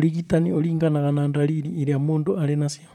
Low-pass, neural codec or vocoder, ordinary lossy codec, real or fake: 19.8 kHz; vocoder, 44.1 kHz, 128 mel bands, Pupu-Vocoder; none; fake